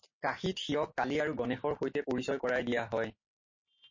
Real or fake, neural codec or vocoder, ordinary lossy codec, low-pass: real; none; MP3, 32 kbps; 7.2 kHz